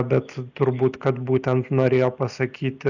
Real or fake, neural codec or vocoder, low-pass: real; none; 7.2 kHz